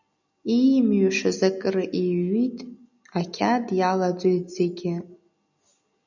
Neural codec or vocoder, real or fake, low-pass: none; real; 7.2 kHz